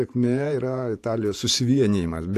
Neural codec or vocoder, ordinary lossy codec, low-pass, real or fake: vocoder, 48 kHz, 128 mel bands, Vocos; AAC, 64 kbps; 14.4 kHz; fake